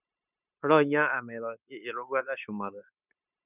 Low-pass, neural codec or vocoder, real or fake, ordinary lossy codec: 3.6 kHz; codec, 16 kHz, 0.9 kbps, LongCat-Audio-Codec; fake; none